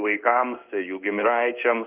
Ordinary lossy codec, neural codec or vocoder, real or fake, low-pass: Opus, 24 kbps; codec, 16 kHz in and 24 kHz out, 1 kbps, XY-Tokenizer; fake; 3.6 kHz